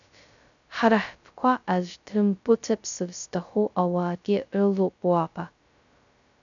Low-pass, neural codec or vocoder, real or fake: 7.2 kHz; codec, 16 kHz, 0.2 kbps, FocalCodec; fake